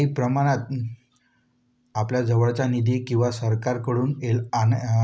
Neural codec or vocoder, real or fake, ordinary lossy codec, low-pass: none; real; none; none